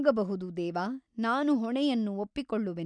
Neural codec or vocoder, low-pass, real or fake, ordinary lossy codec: none; 9.9 kHz; real; none